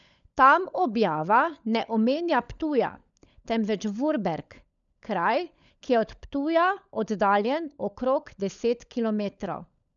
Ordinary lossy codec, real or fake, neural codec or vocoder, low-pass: none; fake; codec, 16 kHz, 16 kbps, FunCodec, trained on LibriTTS, 50 frames a second; 7.2 kHz